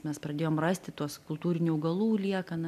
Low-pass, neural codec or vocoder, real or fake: 14.4 kHz; none; real